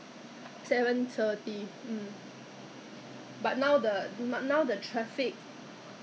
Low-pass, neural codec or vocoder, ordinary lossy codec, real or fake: none; none; none; real